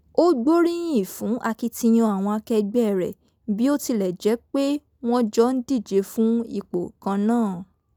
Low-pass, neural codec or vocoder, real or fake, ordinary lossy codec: none; none; real; none